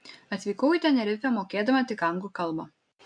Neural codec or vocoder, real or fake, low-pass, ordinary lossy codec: none; real; 9.9 kHz; AAC, 64 kbps